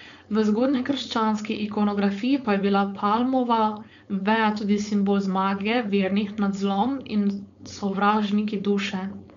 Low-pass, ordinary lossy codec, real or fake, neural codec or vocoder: 7.2 kHz; MP3, 64 kbps; fake; codec, 16 kHz, 4.8 kbps, FACodec